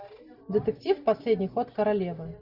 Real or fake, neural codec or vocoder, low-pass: real; none; 5.4 kHz